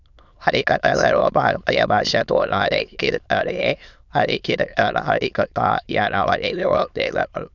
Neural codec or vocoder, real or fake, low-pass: autoencoder, 22.05 kHz, a latent of 192 numbers a frame, VITS, trained on many speakers; fake; 7.2 kHz